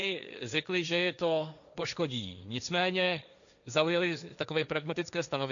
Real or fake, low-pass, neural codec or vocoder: fake; 7.2 kHz; codec, 16 kHz, 1.1 kbps, Voila-Tokenizer